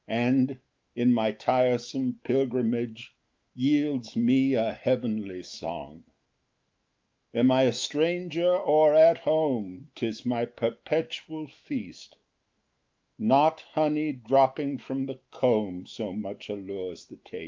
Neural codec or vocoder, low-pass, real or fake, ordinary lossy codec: autoencoder, 48 kHz, 128 numbers a frame, DAC-VAE, trained on Japanese speech; 7.2 kHz; fake; Opus, 32 kbps